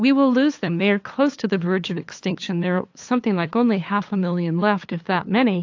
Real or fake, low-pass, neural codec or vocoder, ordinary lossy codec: fake; 7.2 kHz; codec, 16 kHz, 8 kbps, FunCodec, trained on LibriTTS, 25 frames a second; AAC, 48 kbps